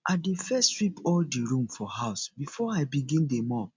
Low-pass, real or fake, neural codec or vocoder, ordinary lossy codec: 7.2 kHz; real; none; MP3, 64 kbps